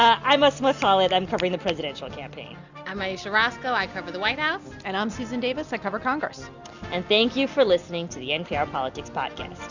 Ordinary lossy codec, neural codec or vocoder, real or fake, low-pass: Opus, 64 kbps; none; real; 7.2 kHz